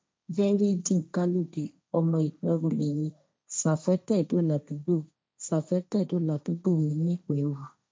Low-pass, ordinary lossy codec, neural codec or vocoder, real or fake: none; none; codec, 16 kHz, 1.1 kbps, Voila-Tokenizer; fake